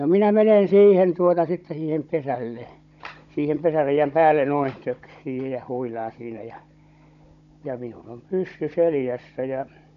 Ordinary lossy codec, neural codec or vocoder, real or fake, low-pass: none; codec, 16 kHz, 16 kbps, FunCodec, trained on Chinese and English, 50 frames a second; fake; 7.2 kHz